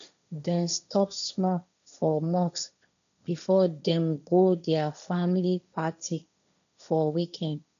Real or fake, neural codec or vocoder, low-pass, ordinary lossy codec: fake; codec, 16 kHz, 1.1 kbps, Voila-Tokenizer; 7.2 kHz; none